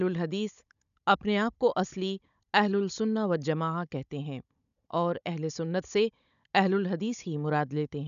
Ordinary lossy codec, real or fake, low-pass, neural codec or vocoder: none; fake; 7.2 kHz; codec, 16 kHz, 16 kbps, FunCodec, trained on Chinese and English, 50 frames a second